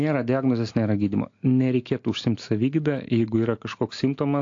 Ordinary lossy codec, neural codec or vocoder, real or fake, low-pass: AAC, 48 kbps; none; real; 7.2 kHz